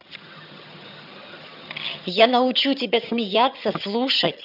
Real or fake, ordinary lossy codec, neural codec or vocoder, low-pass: fake; none; vocoder, 22.05 kHz, 80 mel bands, HiFi-GAN; 5.4 kHz